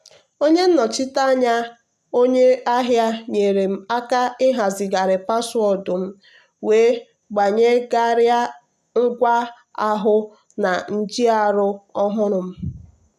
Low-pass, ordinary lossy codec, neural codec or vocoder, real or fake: 14.4 kHz; none; none; real